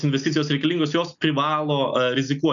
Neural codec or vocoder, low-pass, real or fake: none; 7.2 kHz; real